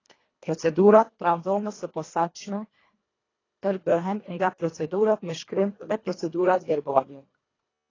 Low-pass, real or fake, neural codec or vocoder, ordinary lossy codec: 7.2 kHz; fake; codec, 24 kHz, 1.5 kbps, HILCodec; AAC, 32 kbps